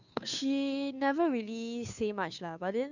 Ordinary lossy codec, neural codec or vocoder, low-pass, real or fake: none; codec, 16 kHz, 2 kbps, FunCodec, trained on Chinese and English, 25 frames a second; 7.2 kHz; fake